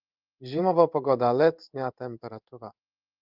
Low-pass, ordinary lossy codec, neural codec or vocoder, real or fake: 5.4 kHz; Opus, 24 kbps; codec, 16 kHz in and 24 kHz out, 1 kbps, XY-Tokenizer; fake